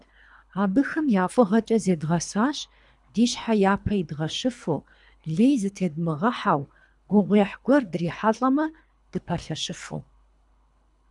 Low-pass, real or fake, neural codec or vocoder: 10.8 kHz; fake; codec, 24 kHz, 3 kbps, HILCodec